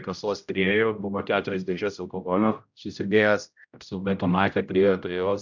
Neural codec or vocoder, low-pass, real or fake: codec, 16 kHz, 0.5 kbps, X-Codec, HuBERT features, trained on general audio; 7.2 kHz; fake